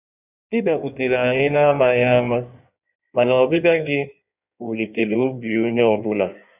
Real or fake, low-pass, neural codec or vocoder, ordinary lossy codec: fake; 3.6 kHz; codec, 16 kHz in and 24 kHz out, 1.1 kbps, FireRedTTS-2 codec; none